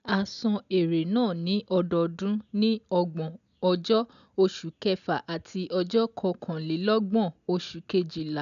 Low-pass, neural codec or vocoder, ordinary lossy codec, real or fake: 7.2 kHz; none; none; real